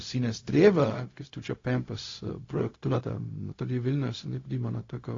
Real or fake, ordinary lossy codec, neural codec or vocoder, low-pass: fake; AAC, 32 kbps; codec, 16 kHz, 0.4 kbps, LongCat-Audio-Codec; 7.2 kHz